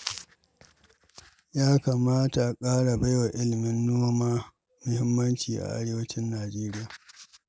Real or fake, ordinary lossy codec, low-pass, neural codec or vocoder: real; none; none; none